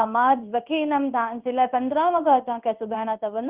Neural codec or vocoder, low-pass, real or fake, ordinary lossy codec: codec, 16 kHz in and 24 kHz out, 1 kbps, XY-Tokenizer; 3.6 kHz; fake; Opus, 24 kbps